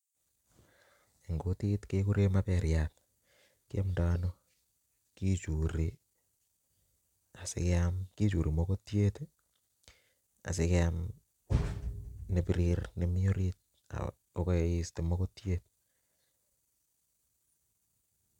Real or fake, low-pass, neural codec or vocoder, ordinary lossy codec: real; 19.8 kHz; none; none